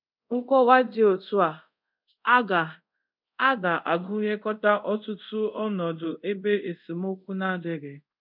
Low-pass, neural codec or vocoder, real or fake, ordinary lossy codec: 5.4 kHz; codec, 24 kHz, 0.5 kbps, DualCodec; fake; none